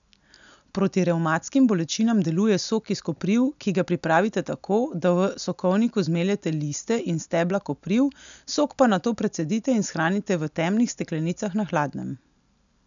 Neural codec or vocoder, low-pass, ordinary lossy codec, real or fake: none; 7.2 kHz; none; real